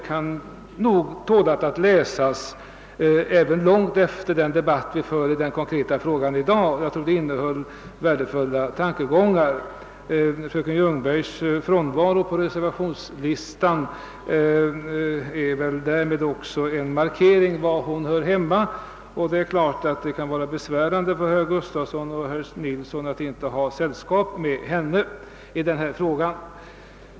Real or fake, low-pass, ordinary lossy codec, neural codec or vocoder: real; none; none; none